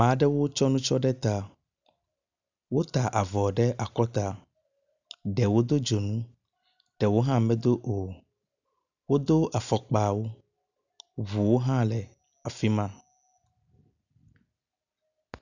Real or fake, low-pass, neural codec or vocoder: real; 7.2 kHz; none